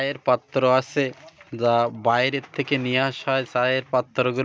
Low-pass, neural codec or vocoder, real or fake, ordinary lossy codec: none; none; real; none